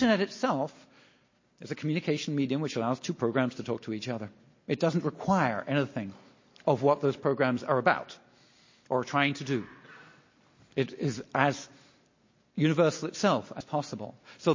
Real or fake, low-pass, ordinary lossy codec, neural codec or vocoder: real; 7.2 kHz; MP3, 32 kbps; none